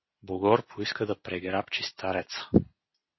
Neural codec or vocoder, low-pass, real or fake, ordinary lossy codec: none; 7.2 kHz; real; MP3, 24 kbps